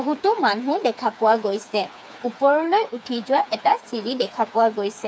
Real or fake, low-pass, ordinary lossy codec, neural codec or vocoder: fake; none; none; codec, 16 kHz, 4 kbps, FreqCodec, smaller model